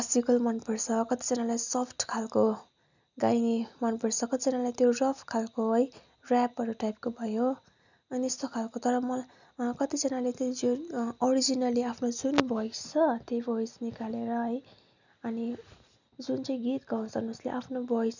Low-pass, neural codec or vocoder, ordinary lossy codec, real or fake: 7.2 kHz; none; none; real